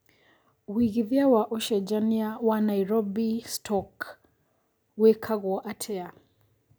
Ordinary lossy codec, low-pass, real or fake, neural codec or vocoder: none; none; real; none